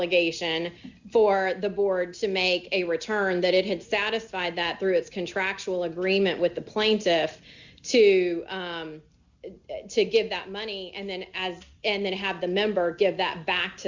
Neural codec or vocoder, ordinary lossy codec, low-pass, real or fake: none; Opus, 64 kbps; 7.2 kHz; real